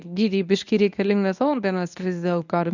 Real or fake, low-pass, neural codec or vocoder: fake; 7.2 kHz; codec, 24 kHz, 0.9 kbps, WavTokenizer, medium speech release version 1